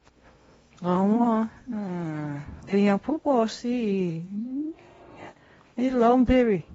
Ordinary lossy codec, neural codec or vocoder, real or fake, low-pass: AAC, 24 kbps; codec, 16 kHz in and 24 kHz out, 0.8 kbps, FocalCodec, streaming, 65536 codes; fake; 10.8 kHz